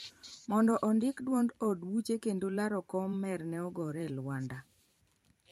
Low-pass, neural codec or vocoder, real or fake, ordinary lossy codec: 19.8 kHz; vocoder, 44.1 kHz, 128 mel bands every 512 samples, BigVGAN v2; fake; MP3, 64 kbps